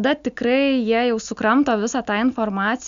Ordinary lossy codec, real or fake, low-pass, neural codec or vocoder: Opus, 64 kbps; real; 7.2 kHz; none